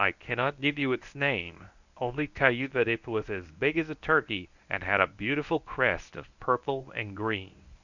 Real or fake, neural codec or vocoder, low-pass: fake; codec, 24 kHz, 0.9 kbps, WavTokenizer, medium speech release version 1; 7.2 kHz